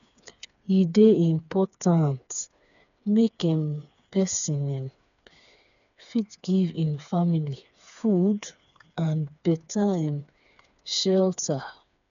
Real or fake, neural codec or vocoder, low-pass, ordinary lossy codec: fake; codec, 16 kHz, 4 kbps, FreqCodec, smaller model; 7.2 kHz; none